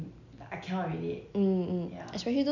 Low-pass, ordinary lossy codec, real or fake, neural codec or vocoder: 7.2 kHz; none; real; none